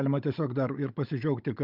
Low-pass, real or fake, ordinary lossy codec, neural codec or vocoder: 5.4 kHz; fake; Opus, 32 kbps; codec, 16 kHz, 16 kbps, FunCodec, trained on Chinese and English, 50 frames a second